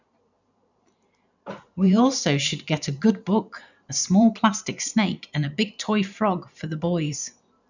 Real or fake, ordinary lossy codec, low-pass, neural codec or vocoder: fake; none; 7.2 kHz; vocoder, 44.1 kHz, 128 mel bands every 512 samples, BigVGAN v2